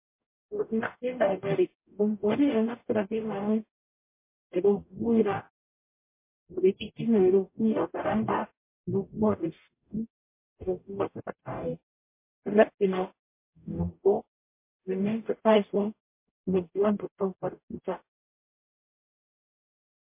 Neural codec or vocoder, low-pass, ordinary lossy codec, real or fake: codec, 44.1 kHz, 0.9 kbps, DAC; 3.6 kHz; MP3, 24 kbps; fake